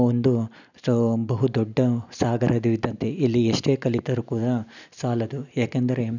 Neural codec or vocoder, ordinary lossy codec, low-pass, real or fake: none; none; 7.2 kHz; real